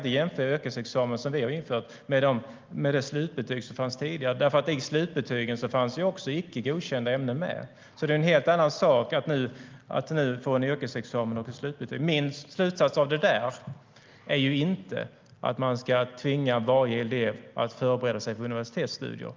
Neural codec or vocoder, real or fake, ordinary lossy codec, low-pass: none; real; Opus, 24 kbps; 7.2 kHz